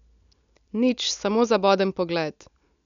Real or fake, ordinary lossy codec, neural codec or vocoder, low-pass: real; none; none; 7.2 kHz